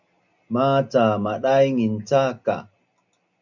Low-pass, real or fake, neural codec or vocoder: 7.2 kHz; real; none